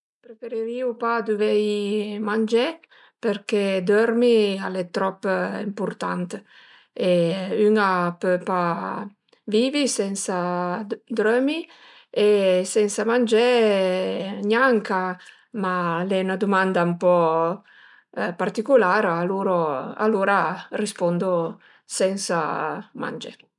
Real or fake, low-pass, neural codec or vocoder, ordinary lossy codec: real; 10.8 kHz; none; none